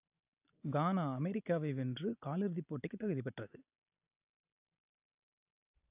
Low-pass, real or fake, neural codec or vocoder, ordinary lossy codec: 3.6 kHz; real; none; AAC, 32 kbps